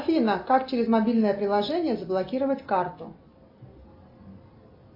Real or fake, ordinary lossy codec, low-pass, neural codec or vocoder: real; AAC, 32 kbps; 5.4 kHz; none